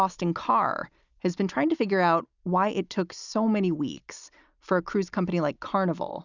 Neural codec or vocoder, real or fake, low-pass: none; real; 7.2 kHz